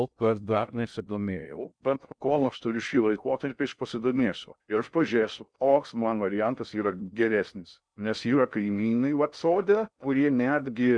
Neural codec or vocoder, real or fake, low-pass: codec, 16 kHz in and 24 kHz out, 0.6 kbps, FocalCodec, streaming, 2048 codes; fake; 9.9 kHz